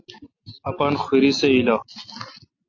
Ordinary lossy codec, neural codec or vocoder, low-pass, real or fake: AAC, 48 kbps; none; 7.2 kHz; real